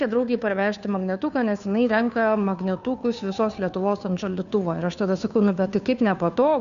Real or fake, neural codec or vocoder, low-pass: fake; codec, 16 kHz, 2 kbps, FunCodec, trained on Chinese and English, 25 frames a second; 7.2 kHz